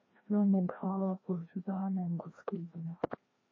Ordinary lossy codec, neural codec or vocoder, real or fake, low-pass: MP3, 32 kbps; codec, 16 kHz, 1 kbps, FreqCodec, larger model; fake; 7.2 kHz